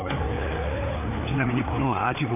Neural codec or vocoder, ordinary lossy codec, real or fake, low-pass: codec, 16 kHz, 4 kbps, FreqCodec, larger model; none; fake; 3.6 kHz